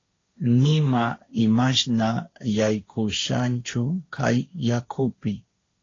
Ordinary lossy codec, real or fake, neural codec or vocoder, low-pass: AAC, 32 kbps; fake; codec, 16 kHz, 1.1 kbps, Voila-Tokenizer; 7.2 kHz